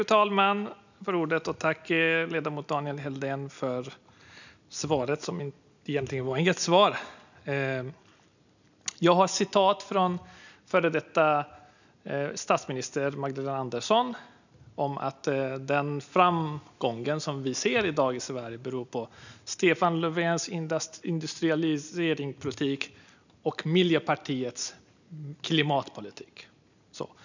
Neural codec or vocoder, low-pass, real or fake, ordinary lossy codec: none; 7.2 kHz; real; none